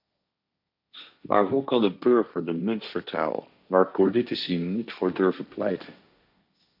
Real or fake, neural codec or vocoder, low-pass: fake; codec, 16 kHz, 1.1 kbps, Voila-Tokenizer; 5.4 kHz